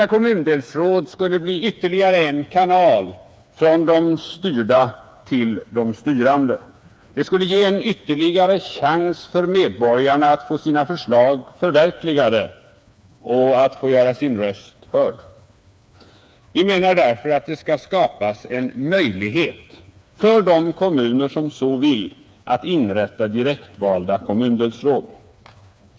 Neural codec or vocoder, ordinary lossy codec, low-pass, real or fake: codec, 16 kHz, 4 kbps, FreqCodec, smaller model; none; none; fake